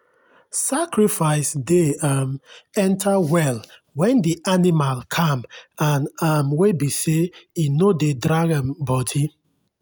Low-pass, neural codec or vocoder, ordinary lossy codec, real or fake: none; none; none; real